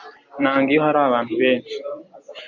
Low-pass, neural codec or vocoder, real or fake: 7.2 kHz; vocoder, 44.1 kHz, 128 mel bands every 256 samples, BigVGAN v2; fake